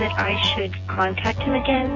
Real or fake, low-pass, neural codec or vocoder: fake; 7.2 kHz; vocoder, 24 kHz, 100 mel bands, Vocos